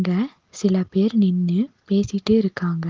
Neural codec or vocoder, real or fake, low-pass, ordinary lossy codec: none; real; 7.2 kHz; Opus, 16 kbps